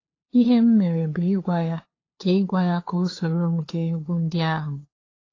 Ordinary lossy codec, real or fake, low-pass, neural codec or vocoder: AAC, 32 kbps; fake; 7.2 kHz; codec, 16 kHz, 2 kbps, FunCodec, trained on LibriTTS, 25 frames a second